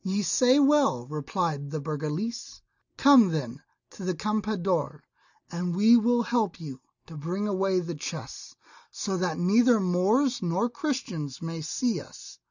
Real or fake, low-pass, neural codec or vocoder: real; 7.2 kHz; none